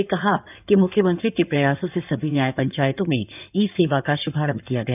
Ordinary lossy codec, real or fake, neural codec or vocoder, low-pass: none; fake; codec, 16 kHz in and 24 kHz out, 2.2 kbps, FireRedTTS-2 codec; 3.6 kHz